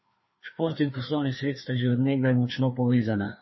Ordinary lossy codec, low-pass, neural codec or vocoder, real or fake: MP3, 24 kbps; 7.2 kHz; codec, 16 kHz, 2 kbps, FreqCodec, larger model; fake